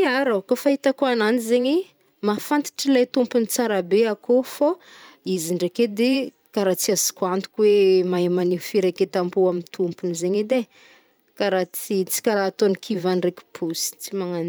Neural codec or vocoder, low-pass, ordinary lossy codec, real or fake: vocoder, 44.1 kHz, 128 mel bands every 512 samples, BigVGAN v2; none; none; fake